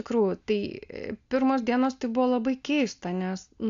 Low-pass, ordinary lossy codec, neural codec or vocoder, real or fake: 7.2 kHz; AAC, 64 kbps; none; real